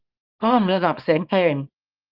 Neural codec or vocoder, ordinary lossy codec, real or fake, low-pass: codec, 24 kHz, 0.9 kbps, WavTokenizer, small release; Opus, 24 kbps; fake; 5.4 kHz